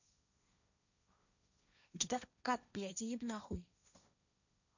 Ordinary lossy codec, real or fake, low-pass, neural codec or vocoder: none; fake; 7.2 kHz; codec, 16 kHz, 1.1 kbps, Voila-Tokenizer